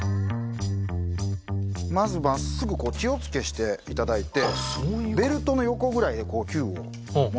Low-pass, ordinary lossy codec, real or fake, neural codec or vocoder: none; none; real; none